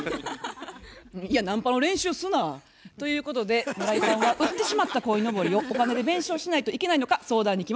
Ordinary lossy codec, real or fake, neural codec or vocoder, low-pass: none; real; none; none